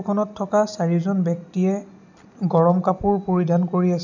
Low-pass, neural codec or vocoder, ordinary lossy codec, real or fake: 7.2 kHz; none; none; real